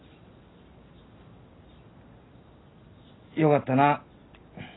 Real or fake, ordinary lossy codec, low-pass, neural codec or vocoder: real; AAC, 16 kbps; 7.2 kHz; none